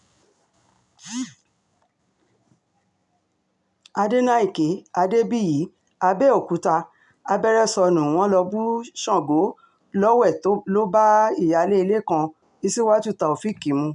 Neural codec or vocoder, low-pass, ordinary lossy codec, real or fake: none; 10.8 kHz; none; real